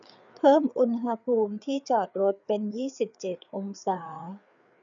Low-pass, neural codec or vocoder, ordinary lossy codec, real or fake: 7.2 kHz; codec, 16 kHz, 4 kbps, FreqCodec, larger model; none; fake